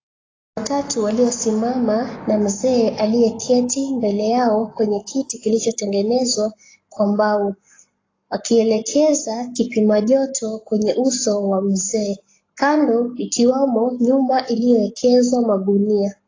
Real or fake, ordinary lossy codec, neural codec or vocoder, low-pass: fake; AAC, 32 kbps; codec, 44.1 kHz, 7.8 kbps, Pupu-Codec; 7.2 kHz